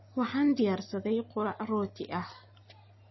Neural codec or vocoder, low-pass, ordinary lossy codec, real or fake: none; 7.2 kHz; MP3, 24 kbps; real